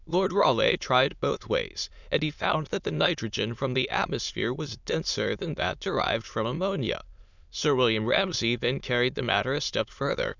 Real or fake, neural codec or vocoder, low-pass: fake; autoencoder, 22.05 kHz, a latent of 192 numbers a frame, VITS, trained on many speakers; 7.2 kHz